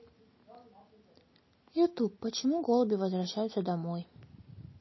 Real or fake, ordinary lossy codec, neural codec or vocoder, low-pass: real; MP3, 24 kbps; none; 7.2 kHz